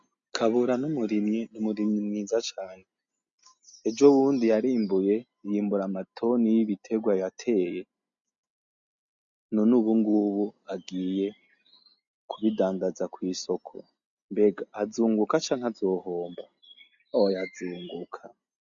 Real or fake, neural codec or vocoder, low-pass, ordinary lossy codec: real; none; 7.2 kHz; MP3, 64 kbps